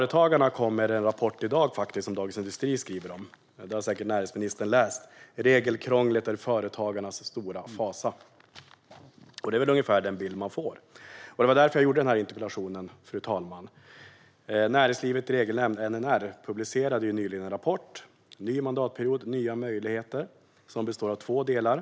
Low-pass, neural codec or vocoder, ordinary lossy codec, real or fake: none; none; none; real